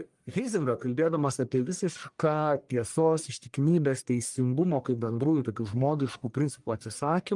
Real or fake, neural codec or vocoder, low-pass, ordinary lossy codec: fake; codec, 44.1 kHz, 1.7 kbps, Pupu-Codec; 10.8 kHz; Opus, 32 kbps